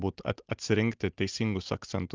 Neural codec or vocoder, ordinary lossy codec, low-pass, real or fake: none; Opus, 24 kbps; 7.2 kHz; real